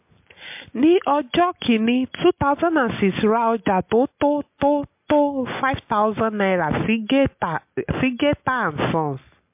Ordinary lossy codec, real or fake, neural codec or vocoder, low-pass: MP3, 32 kbps; real; none; 3.6 kHz